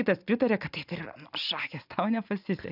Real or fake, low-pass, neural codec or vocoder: real; 5.4 kHz; none